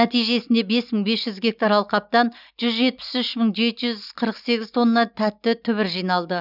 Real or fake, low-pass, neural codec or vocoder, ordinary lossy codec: real; 5.4 kHz; none; none